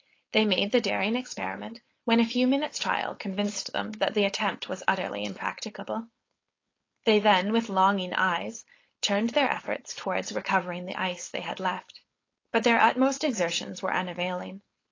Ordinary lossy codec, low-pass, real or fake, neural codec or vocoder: AAC, 32 kbps; 7.2 kHz; fake; codec, 16 kHz, 4.8 kbps, FACodec